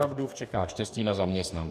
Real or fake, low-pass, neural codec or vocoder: fake; 14.4 kHz; codec, 44.1 kHz, 2.6 kbps, DAC